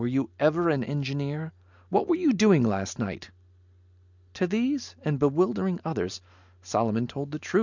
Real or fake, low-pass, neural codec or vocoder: real; 7.2 kHz; none